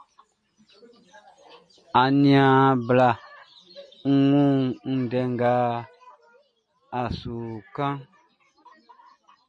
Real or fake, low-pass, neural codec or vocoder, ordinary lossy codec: real; 9.9 kHz; none; MP3, 48 kbps